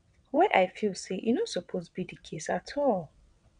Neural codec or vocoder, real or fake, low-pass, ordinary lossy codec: vocoder, 22.05 kHz, 80 mel bands, WaveNeXt; fake; 9.9 kHz; none